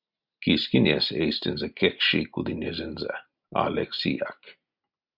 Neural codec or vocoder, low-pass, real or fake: none; 5.4 kHz; real